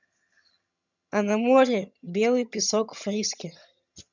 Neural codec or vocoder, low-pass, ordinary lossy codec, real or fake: vocoder, 22.05 kHz, 80 mel bands, HiFi-GAN; 7.2 kHz; none; fake